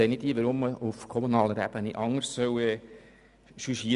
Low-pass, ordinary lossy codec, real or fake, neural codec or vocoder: 10.8 kHz; none; real; none